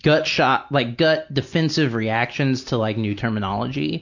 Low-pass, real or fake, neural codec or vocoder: 7.2 kHz; real; none